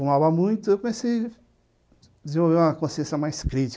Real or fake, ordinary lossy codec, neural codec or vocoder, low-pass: real; none; none; none